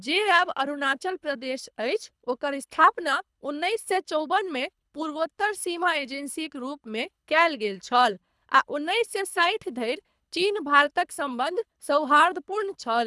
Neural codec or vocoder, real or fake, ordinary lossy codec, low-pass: codec, 24 kHz, 3 kbps, HILCodec; fake; none; none